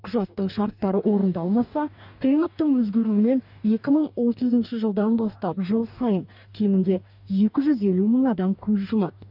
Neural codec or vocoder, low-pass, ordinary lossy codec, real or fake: codec, 44.1 kHz, 2.6 kbps, DAC; 5.4 kHz; none; fake